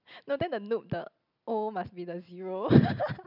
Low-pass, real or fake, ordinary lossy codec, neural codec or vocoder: 5.4 kHz; real; none; none